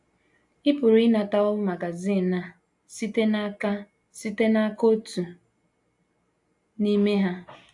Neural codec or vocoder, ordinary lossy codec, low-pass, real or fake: none; AAC, 64 kbps; 10.8 kHz; real